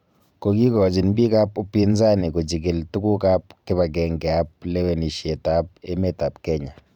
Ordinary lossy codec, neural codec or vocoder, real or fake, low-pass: none; vocoder, 44.1 kHz, 128 mel bands every 512 samples, BigVGAN v2; fake; 19.8 kHz